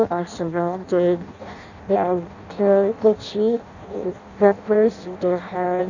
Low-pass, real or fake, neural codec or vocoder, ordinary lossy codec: 7.2 kHz; fake; codec, 16 kHz in and 24 kHz out, 0.6 kbps, FireRedTTS-2 codec; none